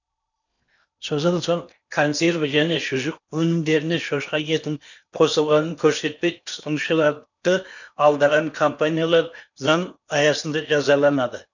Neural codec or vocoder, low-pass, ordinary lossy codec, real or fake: codec, 16 kHz in and 24 kHz out, 0.8 kbps, FocalCodec, streaming, 65536 codes; 7.2 kHz; none; fake